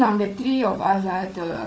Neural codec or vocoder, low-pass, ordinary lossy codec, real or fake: codec, 16 kHz, 4 kbps, FunCodec, trained on Chinese and English, 50 frames a second; none; none; fake